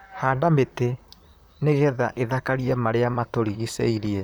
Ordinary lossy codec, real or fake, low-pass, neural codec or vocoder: none; fake; none; vocoder, 44.1 kHz, 128 mel bands, Pupu-Vocoder